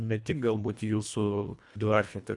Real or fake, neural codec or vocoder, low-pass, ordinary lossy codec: fake; codec, 24 kHz, 1.5 kbps, HILCodec; 10.8 kHz; MP3, 96 kbps